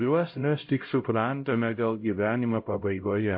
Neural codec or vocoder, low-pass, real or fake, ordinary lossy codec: codec, 16 kHz, 0.5 kbps, X-Codec, HuBERT features, trained on LibriSpeech; 5.4 kHz; fake; MP3, 32 kbps